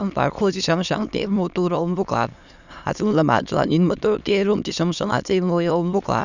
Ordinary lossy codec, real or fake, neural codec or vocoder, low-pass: none; fake; autoencoder, 22.05 kHz, a latent of 192 numbers a frame, VITS, trained on many speakers; 7.2 kHz